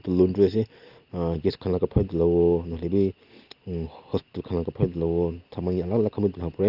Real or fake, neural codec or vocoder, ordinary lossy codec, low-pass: real; none; Opus, 32 kbps; 5.4 kHz